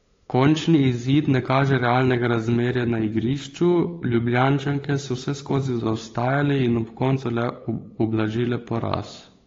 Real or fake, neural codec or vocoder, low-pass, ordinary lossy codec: fake; codec, 16 kHz, 8 kbps, FunCodec, trained on LibriTTS, 25 frames a second; 7.2 kHz; AAC, 32 kbps